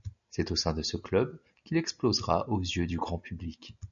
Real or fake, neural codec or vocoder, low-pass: real; none; 7.2 kHz